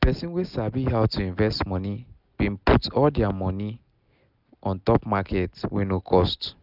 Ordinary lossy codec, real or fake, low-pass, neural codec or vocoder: none; real; 5.4 kHz; none